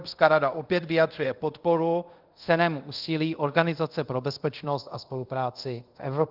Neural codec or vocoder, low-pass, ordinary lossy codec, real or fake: codec, 24 kHz, 0.5 kbps, DualCodec; 5.4 kHz; Opus, 32 kbps; fake